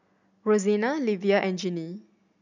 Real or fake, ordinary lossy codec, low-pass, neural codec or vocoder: real; none; 7.2 kHz; none